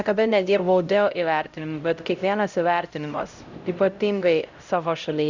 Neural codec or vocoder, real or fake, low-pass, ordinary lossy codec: codec, 16 kHz, 0.5 kbps, X-Codec, HuBERT features, trained on LibriSpeech; fake; 7.2 kHz; Opus, 64 kbps